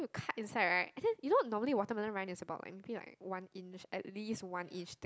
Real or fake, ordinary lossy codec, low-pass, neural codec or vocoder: real; none; none; none